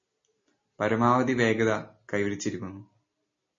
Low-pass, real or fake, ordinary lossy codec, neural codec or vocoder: 7.2 kHz; real; MP3, 32 kbps; none